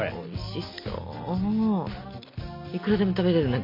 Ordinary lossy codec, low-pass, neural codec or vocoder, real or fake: MP3, 32 kbps; 5.4 kHz; none; real